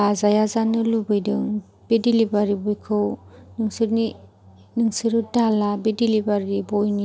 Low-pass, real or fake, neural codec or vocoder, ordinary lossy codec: none; real; none; none